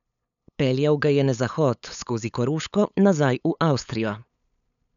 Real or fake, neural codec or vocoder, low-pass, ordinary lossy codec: fake; codec, 16 kHz, 8 kbps, FunCodec, trained on LibriTTS, 25 frames a second; 7.2 kHz; none